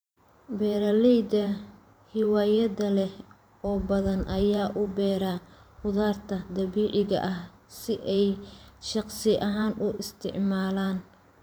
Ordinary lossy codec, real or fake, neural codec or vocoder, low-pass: none; fake; vocoder, 44.1 kHz, 128 mel bands every 512 samples, BigVGAN v2; none